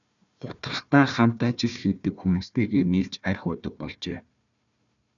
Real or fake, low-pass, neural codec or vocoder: fake; 7.2 kHz; codec, 16 kHz, 1 kbps, FunCodec, trained on Chinese and English, 50 frames a second